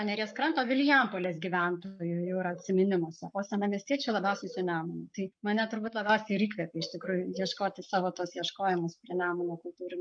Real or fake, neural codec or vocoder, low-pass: fake; codec, 44.1 kHz, 7.8 kbps, Pupu-Codec; 9.9 kHz